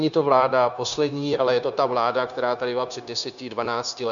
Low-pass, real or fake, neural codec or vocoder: 7.2 kHz; fake; codec, 16 kHz, 0.9 kbps, LongCat-Audio-Codec